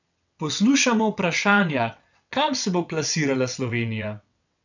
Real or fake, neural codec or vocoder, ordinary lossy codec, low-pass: fake; vocoder, 22.05 kHz, 80 mel bands, WaveNeXt; none; 7.2 kHz